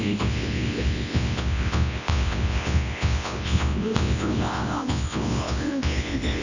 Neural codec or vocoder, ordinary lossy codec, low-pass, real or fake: codec, 24 kHz, 0.9 kbps, WavTokenizer, large speech release; none; 7.2 kHz; fake